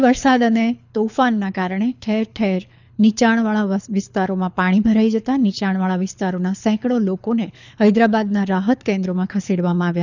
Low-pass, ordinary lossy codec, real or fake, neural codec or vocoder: 7.2 kHz; none; fake; codec, 24 kHz, 6 kbps, HILCodec